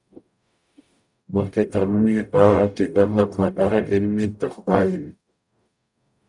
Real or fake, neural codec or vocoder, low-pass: fake; codec, 44.1 kHz, 0.9 kbps, DAC; 10.8 kHz